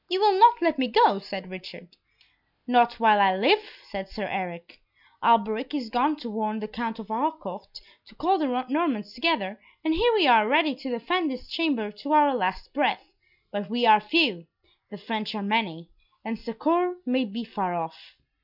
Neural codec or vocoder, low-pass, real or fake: none; 5.4 kHz; real